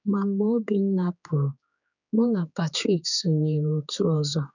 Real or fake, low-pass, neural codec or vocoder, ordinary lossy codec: fake; 7.2 kHz; codec, 16 kHz, 4 kbps, X-Codec, HuBERT features, trained on general audio; none